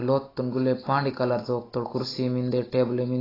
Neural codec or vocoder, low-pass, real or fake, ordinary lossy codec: none; 5.4 kHz; real; AAC, 24 kbps